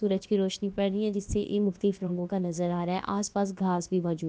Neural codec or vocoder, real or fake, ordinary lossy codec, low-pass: codec, 16 kHz, about 1 kbps, DyCAST, with the encoder's durations; fake; none; none